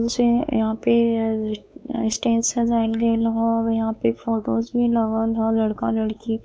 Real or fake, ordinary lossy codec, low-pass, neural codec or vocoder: fake; none; none; codec, 16 kHz, 4 kbps, X-Codec, WavLM features, trained on Multilingual LibriSpeech